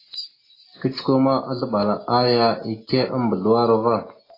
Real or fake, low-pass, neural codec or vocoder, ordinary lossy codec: real; 5.4 kHz; none; AAC, 24 kbps